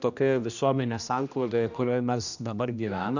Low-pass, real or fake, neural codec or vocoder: 7.2 kHz; fake; codec, 16 kHz, 1 kbps, X-Codec, HuBERT features, trained on general audio